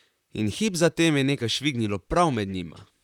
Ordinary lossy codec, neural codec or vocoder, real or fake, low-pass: none; vocoder, 44.1 kHz, 128 mel bands, Pupu-Vocoder; fake; 19.8 kHz